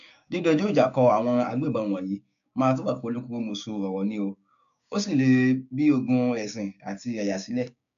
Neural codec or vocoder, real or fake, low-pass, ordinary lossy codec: codec, 16 kHz, 6 kbps, DAC; fake; 7.2 kHz; none